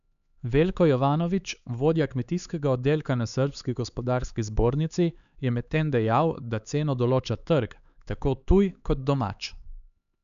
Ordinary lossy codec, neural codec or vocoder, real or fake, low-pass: none; codec, 16 kHz, 4 kbps, X-Codec, HuBERT features, trained on LibriSpeech; fake; 7.2 kHz